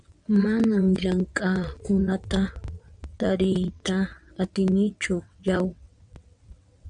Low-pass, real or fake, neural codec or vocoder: 9.9 kHz; fake; vocoder, 22.05 kHz, 80 mel bands, WaveNeXt